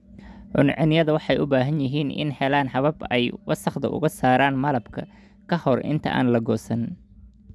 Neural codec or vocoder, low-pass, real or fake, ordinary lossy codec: none; none; real; none